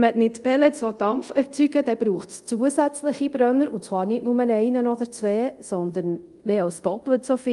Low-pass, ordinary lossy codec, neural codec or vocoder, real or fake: 10.8 kHz; Opus, 32 kbps; codec, 24 kHz, 0.5 kbps, DualCodec; fake